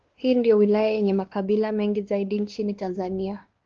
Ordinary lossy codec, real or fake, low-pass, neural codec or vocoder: Opus, 16 kbps; fake; 7.2 kHz; codec, 16 kHz, 2 kbps, X-Codec, WavLM features, trained on Multilingual LibriSpeech